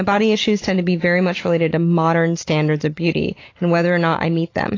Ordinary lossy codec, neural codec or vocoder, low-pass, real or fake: AAC, 32 kbps; none; 7.2 kHz; real